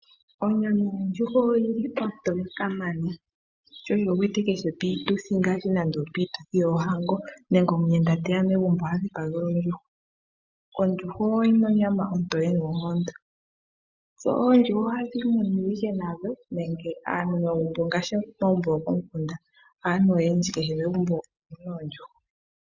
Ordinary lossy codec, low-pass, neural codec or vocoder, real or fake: Opus, 64 kbps; 7.2 kHz; none; real